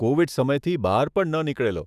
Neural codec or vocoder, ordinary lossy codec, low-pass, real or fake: autoencoder, 48 kHz, 128 numbers a frame, DAC-VAE, trained on Japanese speech; none; 14.4 kHz; fake